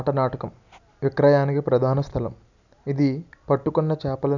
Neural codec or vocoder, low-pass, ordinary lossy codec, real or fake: none; 7.2 kHz; none; real